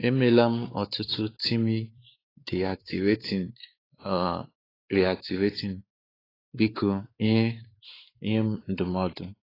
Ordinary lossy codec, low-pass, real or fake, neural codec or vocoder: AAC, 24 kbps; 5.4 kHz; fake; codec, 16 kHz, 4 kbps, X-Codec, WavLM features, trained on Multilingual LibriSpeech